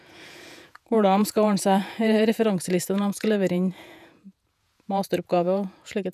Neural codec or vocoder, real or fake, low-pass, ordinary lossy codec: vocoder, 48 kHz, 128 mel bands, Vocos; fake; 14.4 kHz; none